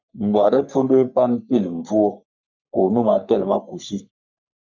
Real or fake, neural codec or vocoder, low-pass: fake; codec, 44.1 kHz, 3.4 kbps, Pupu-Codec; 7.2 kHz